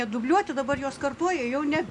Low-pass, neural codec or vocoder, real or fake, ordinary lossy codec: 10.8 kHz; none; real; AAC, 64 kbps